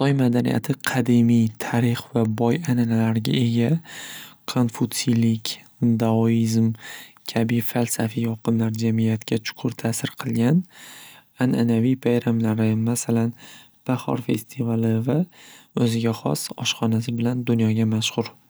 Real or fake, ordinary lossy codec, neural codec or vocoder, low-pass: real; none; none; none